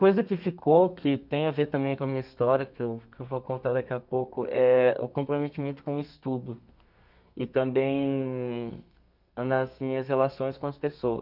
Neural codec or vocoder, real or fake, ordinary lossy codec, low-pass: codec, 32 kHz, 1.9 kbps, SNAC; fake; none; 5.4 kHz